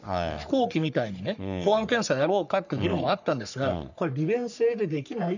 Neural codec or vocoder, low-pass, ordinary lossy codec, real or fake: codec, 44.1 kHz, 3.4 kbps, Pupu-Codec; 7.2 kHz; none; fake